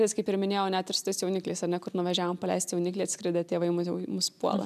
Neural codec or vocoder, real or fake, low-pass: none; real; 14.4 kHz